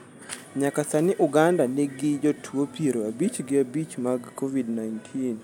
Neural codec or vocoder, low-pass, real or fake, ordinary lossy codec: none; 19.8 kHz; real; none